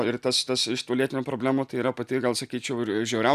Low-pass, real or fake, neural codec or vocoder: 14.4 kHz; real; none